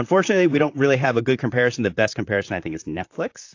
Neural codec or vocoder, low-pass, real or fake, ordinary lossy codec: vocoder, 44.1 kHz, 128 mel bands, Pupu-Vocoder; 7.2 kHz; fake; AAC, 48 kbps